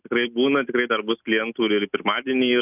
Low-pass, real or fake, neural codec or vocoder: 3.6 kHz; real; none